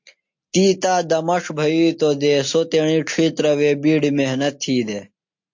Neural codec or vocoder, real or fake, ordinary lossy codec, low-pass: none; real; MP3, 48 kbps; 7.2 kHz